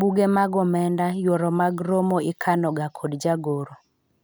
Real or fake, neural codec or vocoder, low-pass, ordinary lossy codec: real; none; none; none